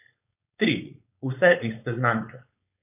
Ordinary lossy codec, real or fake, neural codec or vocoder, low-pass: none; fake; codec, 16 kHz, 4.8 kbps, FACodec; 3.6 kHz